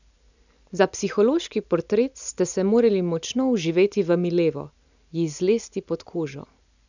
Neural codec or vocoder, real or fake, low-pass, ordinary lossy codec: none; real; 7.2 kHz; none